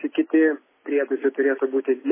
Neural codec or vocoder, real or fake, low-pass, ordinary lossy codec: none; real; 3.6 kHz; MP3, 16 kbps